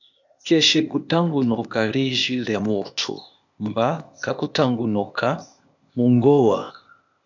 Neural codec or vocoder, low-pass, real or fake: codec, 16 kHz, 0.8 kbps, ZipCodec; 7.2 kHz; fake